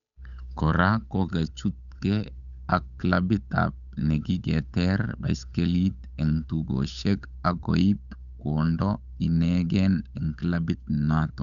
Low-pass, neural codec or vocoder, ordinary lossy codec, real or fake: 7.2 kHz; codec, 16 kHz, 8 kbps, FunCodec, trained on Chinese and English, 25 frames a second; none; fake